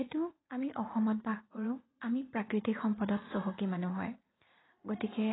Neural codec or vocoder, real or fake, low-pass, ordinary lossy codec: vocoder, 44.1 kHz, 80 mel bands, Vocos; fake; 7.2 kHz; AAC, 16 kbps